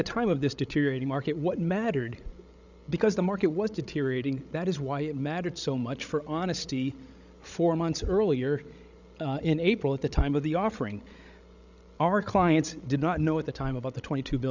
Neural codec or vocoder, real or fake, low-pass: codec, 16 kHz, 16 kbps, FreqCodec, larger model; fake; 7.2 kHz